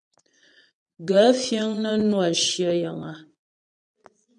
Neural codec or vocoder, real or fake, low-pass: vocoder, 22.05 kHz, 80 mel bands, Vocos; fake; 9.9 kHz